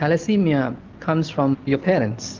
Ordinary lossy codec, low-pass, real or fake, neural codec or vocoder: Opus, 24 kbps; 7.2 kHz; real; none